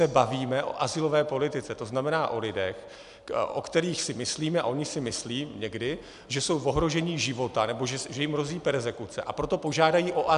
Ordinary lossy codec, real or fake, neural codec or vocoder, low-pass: MP3, 96 kbps; real; none; 10.8 kHz